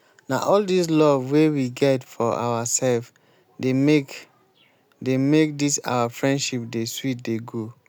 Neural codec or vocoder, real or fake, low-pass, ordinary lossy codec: none; real; none; none